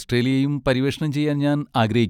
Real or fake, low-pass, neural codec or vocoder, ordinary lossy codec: real; 19.8 kHz; none; none